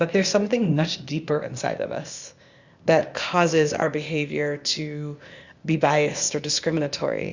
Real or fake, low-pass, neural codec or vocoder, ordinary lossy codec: fake; 7.2 kHz; codec, 16 kHz, 0.8 kbps, ZipCodec; Opus, 64 kbps